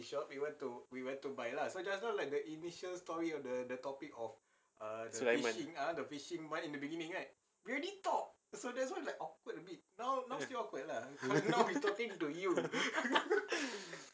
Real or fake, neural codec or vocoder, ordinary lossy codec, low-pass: real; none; none; none